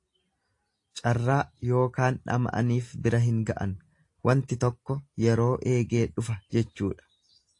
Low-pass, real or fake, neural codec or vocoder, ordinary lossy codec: 10.8 kHz; real; none; AAC, 48 kbps